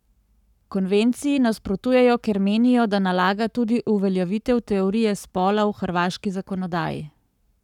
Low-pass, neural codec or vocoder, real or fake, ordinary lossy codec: 19.8 kHz; codec, 44.1 kHz, 7.8 kbps, Pupu-Codec; fake; none